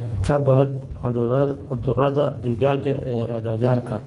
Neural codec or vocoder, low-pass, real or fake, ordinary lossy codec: codec, 24 kHz, 1.5 kbps, HILCodec; 10.8 kHz; fake; none